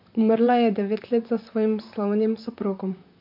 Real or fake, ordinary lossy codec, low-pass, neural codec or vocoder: fake; MP3, 48 kbps; 5.4 kHz; vocoder, 44.1 kHz, 128 mel bands, Pupu-Vocoder